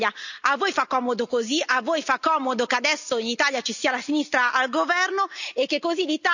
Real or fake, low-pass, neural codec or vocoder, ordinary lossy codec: real; 7.2 kHz; none; none